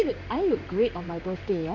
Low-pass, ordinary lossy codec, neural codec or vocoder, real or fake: 7.2 kHz; none; codec, 16 kHz in and 24 kHz out, 1 kbps, XY-Tokenizer; fake